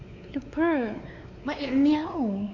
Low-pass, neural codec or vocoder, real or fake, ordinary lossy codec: 7.2 kHz; codec, 16 kHz, 4 kbps, X-Codec, WavLM features, trained on Multilingual LibriSpeech; fake; none